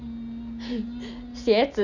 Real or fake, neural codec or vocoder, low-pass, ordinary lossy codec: real; none; 7.2 kHz; none